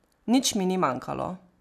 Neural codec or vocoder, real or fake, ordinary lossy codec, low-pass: none; real; none; 14.4 kHz